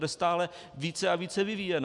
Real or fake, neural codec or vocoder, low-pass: real; none; 10.8 kHz